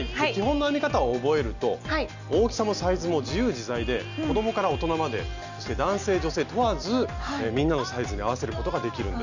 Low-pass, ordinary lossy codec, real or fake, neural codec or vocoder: 7.2 kHz; none; real; none